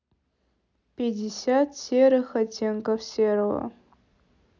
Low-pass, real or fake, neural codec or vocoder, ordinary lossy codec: 7.2 kHz; real; none; none